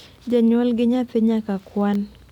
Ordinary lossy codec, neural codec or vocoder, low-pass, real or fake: none; vocoder, 44.1 kHz, 128 mel bands every 256 samples, BigVGAN v2; 19.8 kHz; fake